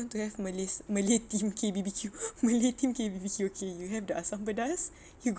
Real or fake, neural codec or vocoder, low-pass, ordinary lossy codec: real; none; none; none